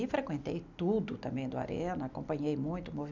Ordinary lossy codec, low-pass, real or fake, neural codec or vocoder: none; 7.2 kHz; real; none